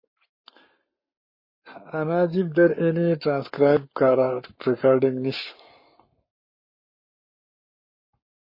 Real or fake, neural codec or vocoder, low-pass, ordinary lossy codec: fake; codec, 44.1 kHz, 7.8 kbps, Pupu-Codec; 5.4 kHz; MP3, 24 kbps